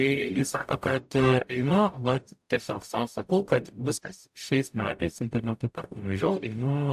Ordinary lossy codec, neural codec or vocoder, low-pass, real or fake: AAC, 96 kbps; codec, 44.1 kHz, 0.9 kbps, DAC; 14.4 kHz; fake